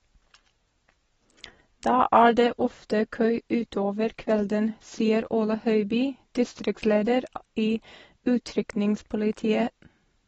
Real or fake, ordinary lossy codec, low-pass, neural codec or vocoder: real; AAC, 24 kbps; 10.8 kHz; none